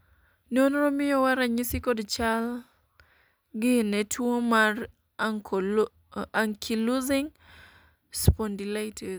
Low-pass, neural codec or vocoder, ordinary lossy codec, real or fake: none; none; none; real